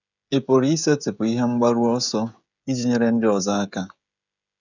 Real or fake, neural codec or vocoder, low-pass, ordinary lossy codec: fake; codec, 16 kHz, 16 kbps, FreqCodec, smaller model; 7.2 kHz; none